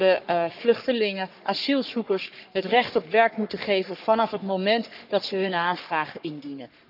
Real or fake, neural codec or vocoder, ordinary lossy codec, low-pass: fake; codec, 44.1 kHz, 3.4 kbps, Pupu-Codec; none; 5.4 kHz